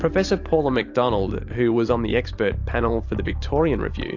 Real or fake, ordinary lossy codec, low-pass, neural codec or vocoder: fake; AAC, 48 kbps; 7.2 kHz; vocoder, 44.1 kHz, 128 mel bands every 512 samples, BigVGAN v2